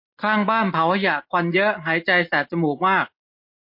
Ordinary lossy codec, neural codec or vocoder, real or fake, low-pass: MP3, 32 kbps; none; real; 5.4 kHz